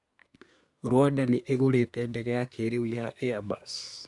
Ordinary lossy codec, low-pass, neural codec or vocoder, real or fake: none; 10.8 kHz; codec, 24 kHz, 1 kbps, SNAC; fake